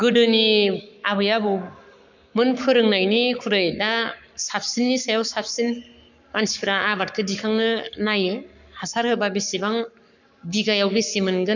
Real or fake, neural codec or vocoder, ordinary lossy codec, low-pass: fake; codec, 44.1 kHz, 7.8 kbps, Pupu-Codec; none; 7.2 kHz